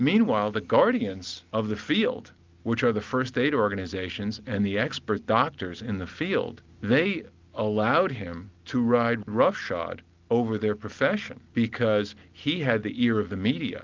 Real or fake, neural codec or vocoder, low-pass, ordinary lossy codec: real; none; 7.2 kHz; Opus, 32 kbps